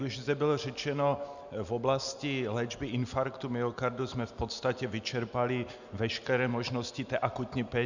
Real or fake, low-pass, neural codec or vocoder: real; 7.2 kHz; none